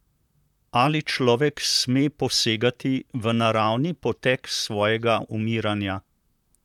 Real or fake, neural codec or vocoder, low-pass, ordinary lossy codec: fake; vocoder, 44.1 kHz, 128 mel bands, Pupu-Vocoder; 19.8 kHz; none